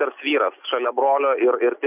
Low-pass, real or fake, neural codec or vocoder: 3.6 kHz; real; none